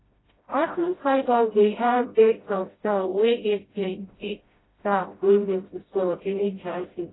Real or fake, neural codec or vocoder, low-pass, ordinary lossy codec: fake; codec, 16 kHz, 0.5 kbps, FreqCodec, smaller model; 7.2 kHz; AAC, 16 kbps